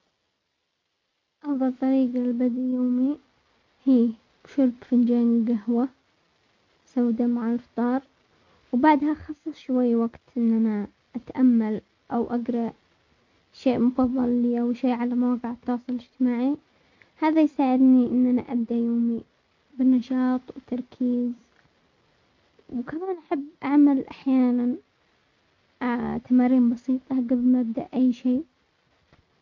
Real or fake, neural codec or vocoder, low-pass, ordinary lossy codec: real; none; 7.2 kHz; MP3, 48 kbps